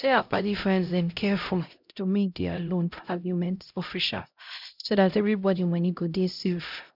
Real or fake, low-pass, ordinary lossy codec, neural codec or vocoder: fake; 5.4 kHz; none; codec, 16 kHz, 0.5 kbps, X-Codec, HuBERT features, trained on LibriSpeech